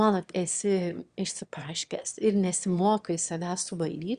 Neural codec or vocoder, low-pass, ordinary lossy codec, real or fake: autoencoder, 22.05 kHz, a latent of 192 numbers a frame, VITS, trained on one speaker; 9.9 kHz; Opus, 64 kbps; fake